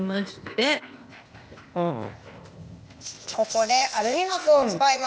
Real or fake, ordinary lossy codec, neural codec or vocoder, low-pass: fake; none; codec, 16 kHz, 0.8 kbps, ZipCodec; none